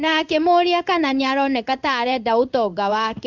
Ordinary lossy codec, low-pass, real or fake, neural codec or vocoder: none; 7.2 kHz; fake; codec, 16 kHz in and 24 kHz out, 1 kbps, XY-Tokenizer